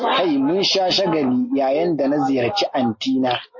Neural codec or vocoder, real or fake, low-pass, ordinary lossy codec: none; real; 7.2 kHz; MP3, 32 kbps